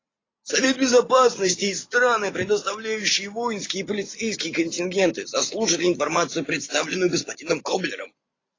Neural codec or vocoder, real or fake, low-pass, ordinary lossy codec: none; real; 7.2 kHz; AAC, 32 kbps